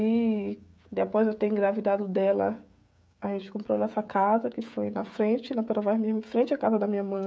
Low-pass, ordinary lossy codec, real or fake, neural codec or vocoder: none; none; fake; codec, 16 kHz, 16 kbps, FreqCodec, smaller model